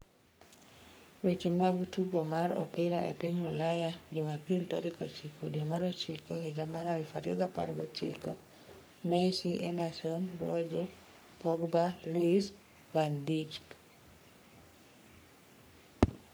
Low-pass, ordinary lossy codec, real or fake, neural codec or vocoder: none; none; fake; codec, 44.1 kHz, 3.4 kbps, Pupu-Codec